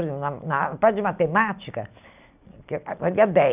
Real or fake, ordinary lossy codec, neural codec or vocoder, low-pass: real; none; none; 3.6 kHz